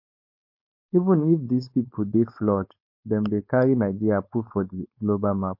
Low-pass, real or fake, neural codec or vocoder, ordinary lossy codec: 5.4 kHz; fake; codec, 16 kHz, 4.8 kbps, FACodec; MP3, 32 kbps